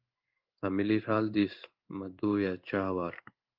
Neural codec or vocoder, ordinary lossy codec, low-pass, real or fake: codec, 16 kHz in and 24 kHz out, 1 kbps, XY-Tokenizer; Opus, 24 kbps; 5.4 kHz; fake